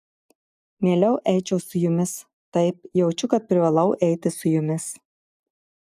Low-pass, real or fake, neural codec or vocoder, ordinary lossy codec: 14.4 kHz; real; none; AAC, 96 kbps